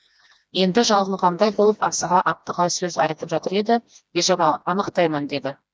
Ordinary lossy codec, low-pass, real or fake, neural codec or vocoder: none; none; fake; codec, 16 kHz, 1 kbps, FreqCodec, smaller model